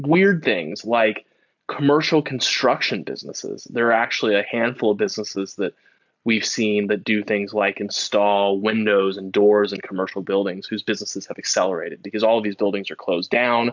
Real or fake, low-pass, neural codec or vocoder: real; 7.2 kHz; none